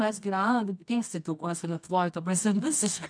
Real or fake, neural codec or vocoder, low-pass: fake; codec, 24 kHz, 0.9 kbps, WavTokenizer, medium music audio release; 9.9 kHz